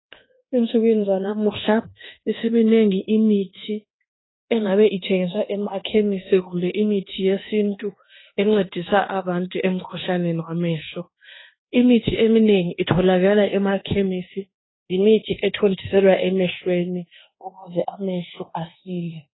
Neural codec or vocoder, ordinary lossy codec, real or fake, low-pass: codec, 24 kHz, 1.2 kbps, DualCodec; AAC, 16 kbps; fake; 7.2 kHz